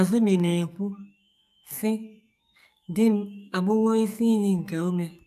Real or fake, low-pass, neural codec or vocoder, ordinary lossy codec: fake; 14.4 kHz; codec, 44.1 kHz, 2.6 kbps, SNAC; none